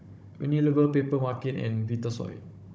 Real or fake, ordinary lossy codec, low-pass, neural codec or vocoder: fake; none; none; codec, 16 kHz, 16 kbps, FunCodec, trained on Chinese and English, 50 frames a second